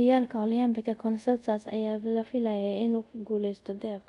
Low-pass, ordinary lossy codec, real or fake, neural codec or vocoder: 10.8 kHz; none; fake; codec, 24 kHz, 0.5 kbps, DualCodec